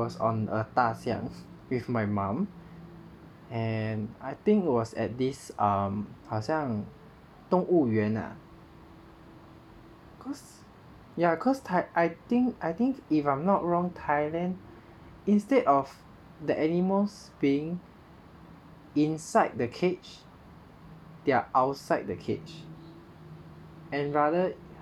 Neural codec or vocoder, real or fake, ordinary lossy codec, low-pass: none; real; none; 19.8 kHz